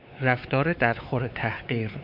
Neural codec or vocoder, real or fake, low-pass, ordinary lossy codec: codec, 16 kHz, 4 kbps, X-Codec, WavLM features, trained on Multilingual LibriSpeech; fake; 5.4 kHz; MP3, 48 kbps